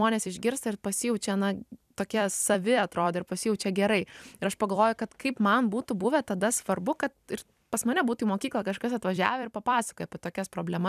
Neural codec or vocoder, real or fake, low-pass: vocoder, 44.1 kHz, 128 mel bands every 256 samples, BigVGAN v2; fake; 14.4 kHz